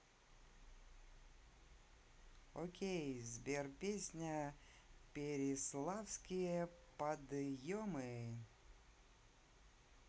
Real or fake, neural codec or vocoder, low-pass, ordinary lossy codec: real; none; none; none